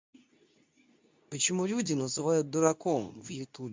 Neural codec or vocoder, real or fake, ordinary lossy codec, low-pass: codec, 24 kHz, 0.9 kbps, WavTokenizer, medium speech release version 2; fake; MP3, 64 kbps; 7.2 kHz